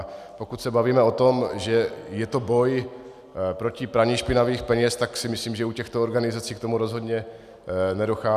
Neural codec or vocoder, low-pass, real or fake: none; 14.4 kHz; real